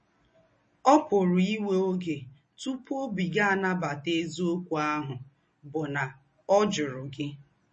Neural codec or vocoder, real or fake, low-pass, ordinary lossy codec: none; real; 10.8 kHz; MP3, 32 kbps